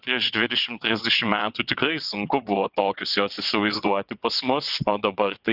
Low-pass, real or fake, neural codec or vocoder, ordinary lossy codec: 5.4 kHz; fake; vocoder, 22.05 kHz, 80 mel bands, WaveNeXt; Opus, 64 kbps